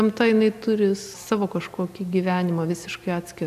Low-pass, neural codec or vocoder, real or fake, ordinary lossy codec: 14.4 kHz; none; real; AAC, 96 kbps